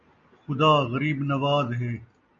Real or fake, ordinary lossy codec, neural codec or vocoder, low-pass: real; MP3, 48 kbps; none; 7.2 kHz